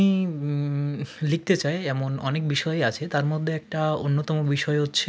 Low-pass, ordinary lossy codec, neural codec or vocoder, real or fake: none; none; none; real